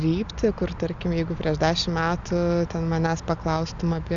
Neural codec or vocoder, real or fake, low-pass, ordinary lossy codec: none; real; 7.2 kHz; Opus, 64 kbps